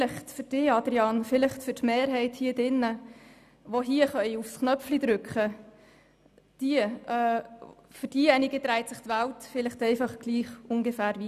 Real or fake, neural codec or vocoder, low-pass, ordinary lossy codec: real; none; 14.4 kHz; none